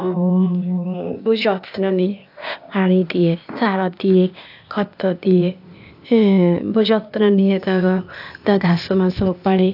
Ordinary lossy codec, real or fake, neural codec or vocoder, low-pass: AAC, 48 kbps; fake; codec, 16 kHz, 0.8 kbps, ZipCodec; 5.4 kHz